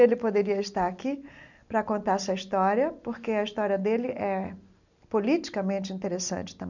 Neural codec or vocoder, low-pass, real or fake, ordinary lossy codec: none; 7.2 kHz; real; none